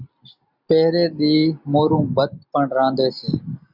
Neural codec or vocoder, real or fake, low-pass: none; real; 5.4 kHz